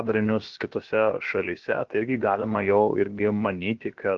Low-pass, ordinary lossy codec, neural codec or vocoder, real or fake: 7.2 kHz; Opus, 16 kbps; codec, 16 kHz, about 1 kbps, DyCAST, with the encoder's durations; fake